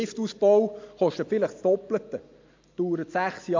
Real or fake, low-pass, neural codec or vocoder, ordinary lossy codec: real; 7.2 kHz; none; MP3, 64 kbps